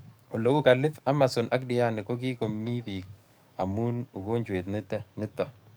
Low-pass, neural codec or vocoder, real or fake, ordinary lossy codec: none; codec, 44.1 kHz, 7.8 kbps, DAC; fake; none